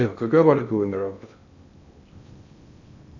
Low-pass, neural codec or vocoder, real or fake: 7.2 kHz; codec, 16 kHz in and 24 kHz out, 0.6 kbps, FocalCodec, streaming, 2048 codes; fake